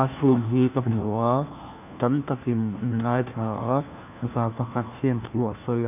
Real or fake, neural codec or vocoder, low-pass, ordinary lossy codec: fake; codec, 16 kHz, 1 kbps, FunCodec, trained on LibriTTS, 50 frames a second; 3.6 kHz; none